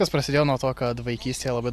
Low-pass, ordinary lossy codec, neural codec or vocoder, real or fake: 14.4 kHz; AAC, 96 kbps; none; real